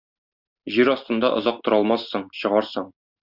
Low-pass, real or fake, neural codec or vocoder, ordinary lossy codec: 5.4 kHz; real; none; Opus, 64 kbps